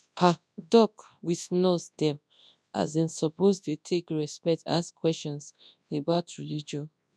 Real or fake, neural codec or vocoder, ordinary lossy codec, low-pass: fake; codec, 24 kHz, 0.9 kbps, WavTokenizer, large speech release; none; none